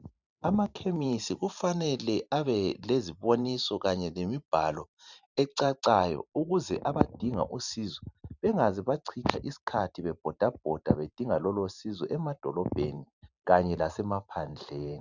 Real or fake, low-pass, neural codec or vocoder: fake; 7.2 kHz; vocoder, 44.1 kHz, 128 mel bands every 512 samples, BigVGAN v2